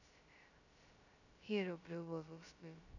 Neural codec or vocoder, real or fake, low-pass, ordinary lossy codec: codec, 16 kHz, 0.2 kbps, FocalCodec; fake; 7.2 kHz; none